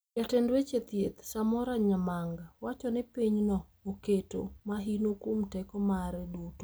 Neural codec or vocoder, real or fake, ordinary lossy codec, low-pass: none; real; none; none